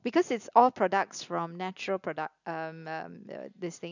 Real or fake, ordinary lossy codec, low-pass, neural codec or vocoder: real; none; 7.2 kHz; none